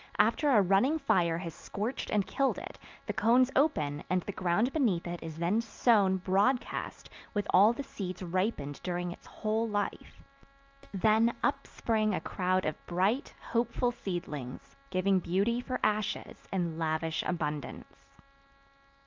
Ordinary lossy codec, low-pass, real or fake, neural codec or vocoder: Opus, 24 kbps; 7.2 kHz; real; none